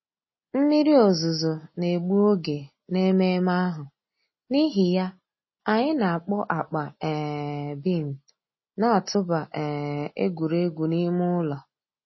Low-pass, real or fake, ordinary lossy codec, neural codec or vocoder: 7.2 kHz; real; MP3, 24 kbps; none